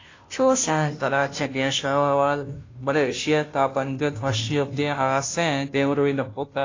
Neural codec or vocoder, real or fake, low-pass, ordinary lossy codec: codec, 16 kHz, 0.5 kbps, FunCodec, trained on Chinese and English, 25 frames a second; fake; 7.2 kHz; AAC, 32 kbps